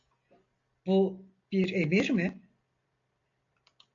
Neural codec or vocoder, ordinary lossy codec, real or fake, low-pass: none; AAC, 48 kbps; real; 7.2 kHz